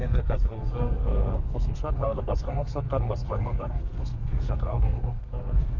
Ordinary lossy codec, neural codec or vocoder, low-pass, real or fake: none; codec, 32 kHz, 1.9 kbps, SNAC; 7.2 kHz; fake